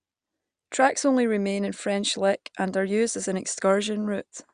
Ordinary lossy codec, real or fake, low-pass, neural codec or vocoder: Opus, 64 kbps; real; 9.9 kHz; none